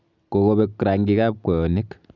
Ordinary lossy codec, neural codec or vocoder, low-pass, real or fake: none; none; 7.2 kHz; real